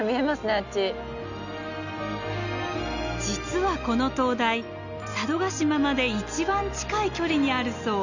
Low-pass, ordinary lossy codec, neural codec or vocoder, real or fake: 7.2 kHz; none; none; real